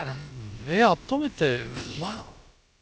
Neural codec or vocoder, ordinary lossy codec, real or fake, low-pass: codec, 16 kHz, about 1 kbps, DyCAST, with the encoder's durations; none; fake; none